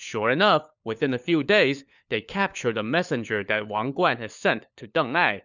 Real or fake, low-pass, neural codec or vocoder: fake; 7.2 kHz; codec, 16 kHz, 4 kbps, FunCodec, trained on LibriTTS, 50 frames a second